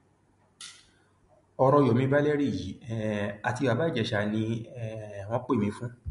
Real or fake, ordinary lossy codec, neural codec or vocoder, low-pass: real; MP3, 48 kbps; none; 14.4 kHz